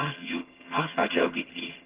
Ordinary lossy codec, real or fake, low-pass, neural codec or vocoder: Opus, 16 kbps; fake; 3.6 kHz; vocoder, 22.05 kHz, 80 mel bands, HiFi-GAN